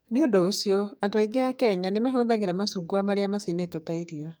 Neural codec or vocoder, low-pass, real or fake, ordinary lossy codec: codec, 44.1 kHz, 2.6 kbps, SNAC; none; fake; none